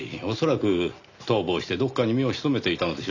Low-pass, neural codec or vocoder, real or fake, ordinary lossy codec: 7.2 kHz; none; real; none